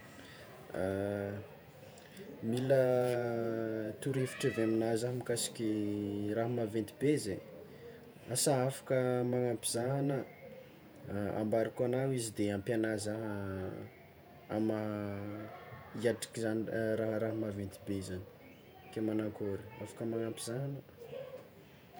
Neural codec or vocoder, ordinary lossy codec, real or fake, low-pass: vocoder, 48 kHz, 128 mel bands, Vocos; none; fake; none